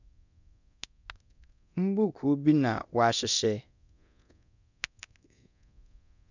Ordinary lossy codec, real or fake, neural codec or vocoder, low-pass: none; fake; codec, 24 kHz, 0.9 kbps, DualCodec; 7.2 kHz